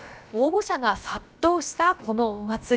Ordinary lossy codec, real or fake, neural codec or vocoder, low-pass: none; fake; codec, 16 kHz, about 1 kbps, DyCAST, with the encoder's durations; none